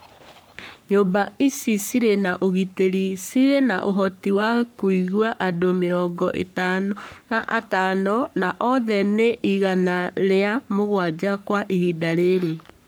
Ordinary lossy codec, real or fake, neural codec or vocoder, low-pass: none; fake; codec, 44.1 kHz, 3.4 kbps, Pupu-Codec; none